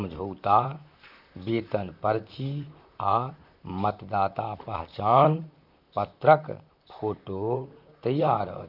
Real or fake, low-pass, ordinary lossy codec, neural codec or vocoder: fake; 5.4 kHz; none; vocoder, 44.1 kHz, 128 mel bands, Pupu-Vocoder